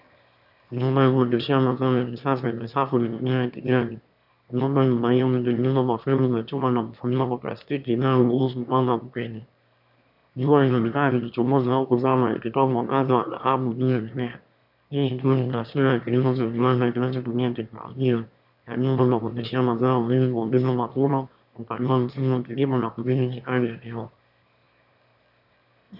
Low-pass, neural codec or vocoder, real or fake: 5.4 kHz; autoencoder, 22.05 kHz, a latent of 192 numbers a frame, VITS, trained on one speaker; fake